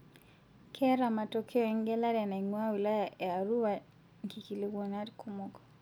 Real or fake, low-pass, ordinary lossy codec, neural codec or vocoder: real; none; none; none